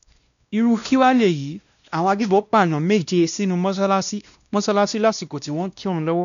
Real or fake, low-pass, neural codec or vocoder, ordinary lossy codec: fake; 7.2 kHz; codec, 16 kHz, 1 kbps, X-Codec, WavLM features, trained on Multilingual LibriSpeech; none